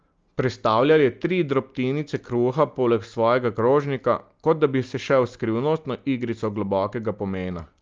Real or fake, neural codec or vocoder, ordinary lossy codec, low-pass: real; none; Opus, 32 kbps; 7.2 kHz